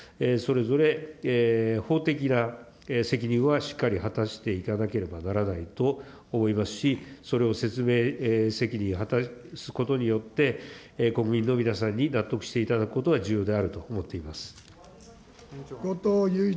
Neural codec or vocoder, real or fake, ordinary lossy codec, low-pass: none; real; none; none